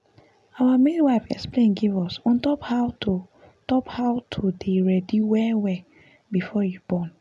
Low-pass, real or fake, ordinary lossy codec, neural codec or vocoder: none; real; none; none